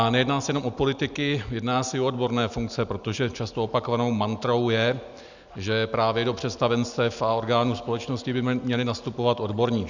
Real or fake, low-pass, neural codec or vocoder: real; 7.2 kHz; none